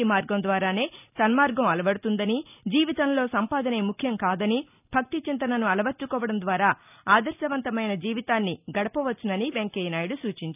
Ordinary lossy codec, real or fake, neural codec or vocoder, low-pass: none; real; none; 3.6 kHz